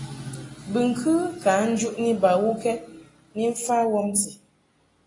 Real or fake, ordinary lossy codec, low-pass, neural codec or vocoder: real; AAC, 32 kbps; 10.8 kHz; none